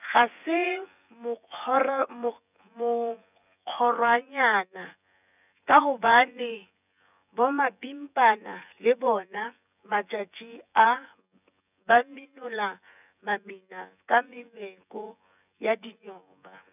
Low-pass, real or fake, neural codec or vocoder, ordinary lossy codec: 3.6 kHz; fake; vocoder, 24 kHz, 100 mel bands, Vocos; none